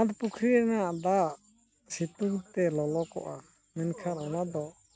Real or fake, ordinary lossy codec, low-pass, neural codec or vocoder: real; none; none; none